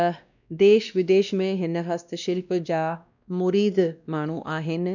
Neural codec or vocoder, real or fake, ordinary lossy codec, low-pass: codec, 16 kHz, 1 kbps, X-Codec, WavLM features, trained on Multilingual LibriSpeech; fake; none; 7.2 kHz